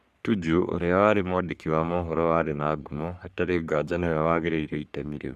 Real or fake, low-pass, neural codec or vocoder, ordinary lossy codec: fake; 14.4 kHz; codec, 44.1 kHz, 3.4 kbps, Pupu-Codec; Opus, 64 kbps